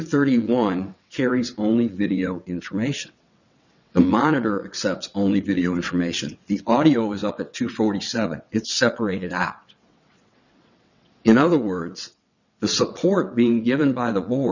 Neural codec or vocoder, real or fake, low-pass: vocoder, 22.05 kHz, 80 mel bands, WaveNeXt; fake; 7.2 kHz